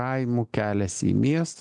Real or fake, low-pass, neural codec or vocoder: real; 10.8 kHz; none